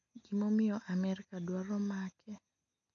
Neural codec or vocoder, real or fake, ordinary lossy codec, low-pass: none; real; MP3, 64 kbps; 7.2 kHz